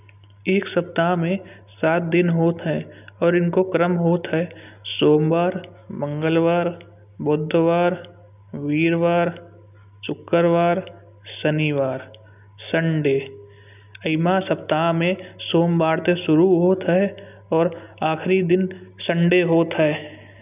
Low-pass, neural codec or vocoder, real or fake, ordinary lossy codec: 3.6 kHz; none; real; none